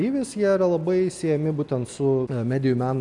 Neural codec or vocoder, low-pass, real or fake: none; 10.8 kHz; real